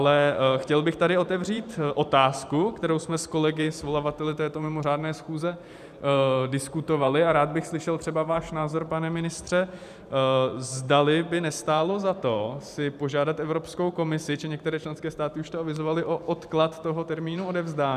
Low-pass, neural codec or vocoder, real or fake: 14.4 kHz; vocoder, 44.1 kHz, 128 mel bands every 512 samples, BigVGAN v2; fake